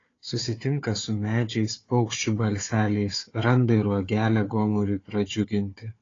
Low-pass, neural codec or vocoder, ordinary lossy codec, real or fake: 7.2 kHz; codec, 16 kHz, 4 kbps, FunCodec, trained on Chinese and English, 50 frames a second; AAC, 32 kbps; fake